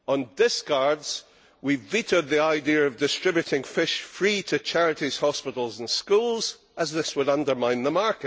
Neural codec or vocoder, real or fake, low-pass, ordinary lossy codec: none; real; none; none